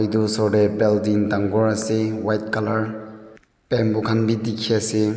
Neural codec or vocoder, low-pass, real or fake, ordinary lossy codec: none; none; real; none